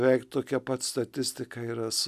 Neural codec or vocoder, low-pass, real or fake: none; 14.4 kHz; real